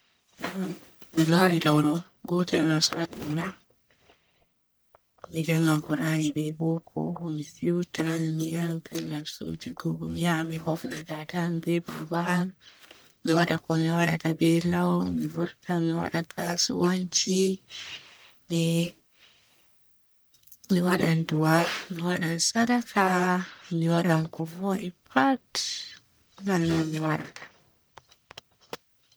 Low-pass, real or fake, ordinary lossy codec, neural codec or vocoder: none; fake; none; codec, 44.1 kHz, 1.7 kbps, Pupu-Codec